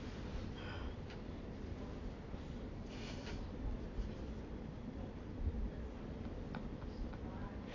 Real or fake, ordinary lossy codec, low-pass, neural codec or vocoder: fake; AAC, 32 kbps; 7.2 kHz; vocoder, 44.1 kHz, 128 mel bands every 256 samples, BigVGAN v2